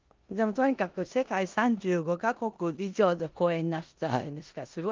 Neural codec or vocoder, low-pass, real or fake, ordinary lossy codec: codec, 16 kHz in and 24 kHz out, 0.9 kbps, LongCat-Audio-Codec, four codebook decoder; 7.2 kHz; fake; Opus, 24 kbps